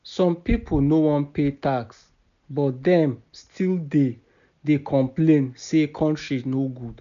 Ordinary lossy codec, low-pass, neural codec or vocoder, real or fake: none; 7.2 kHz; none; real